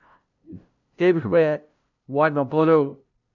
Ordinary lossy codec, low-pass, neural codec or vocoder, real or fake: none; 7.2 kHz; codec, 16 kHz, 0.5 kbps, FunCodec, trained on LibriTTS, 25 frames a second; fake